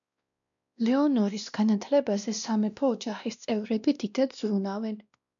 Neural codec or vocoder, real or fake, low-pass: codec, 16 kHz, 1 kbps, X-Codec, WavLM features, trained on Multilingual LibriSpeech; fake; 7.2 kHz